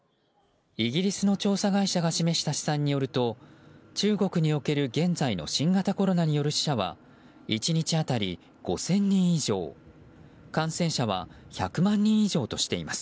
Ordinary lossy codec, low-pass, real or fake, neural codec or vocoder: none; none; real; none